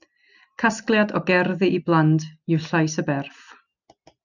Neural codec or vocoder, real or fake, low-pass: none; real; 7.2 kHz